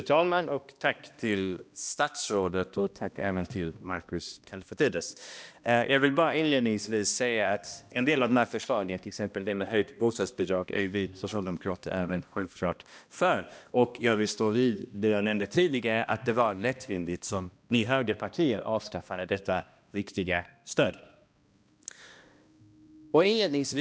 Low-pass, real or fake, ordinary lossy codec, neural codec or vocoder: none; fake; none; codec, 16 kHz, 1 kbps, X-Codec, HuBERT features, trained on balanced general audio